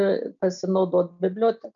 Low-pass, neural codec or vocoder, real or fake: 7.2 kHz; none; real